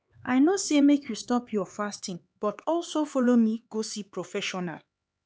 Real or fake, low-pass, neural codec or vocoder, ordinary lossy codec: fake; none; codec, 16 kHz, 4 kbps, X-Codec, HuBERT features, trained on LibriSpeech; none